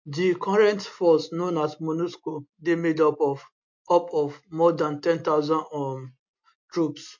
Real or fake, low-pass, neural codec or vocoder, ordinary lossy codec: real; 7.2 kHz; none; MP3, 48 kbps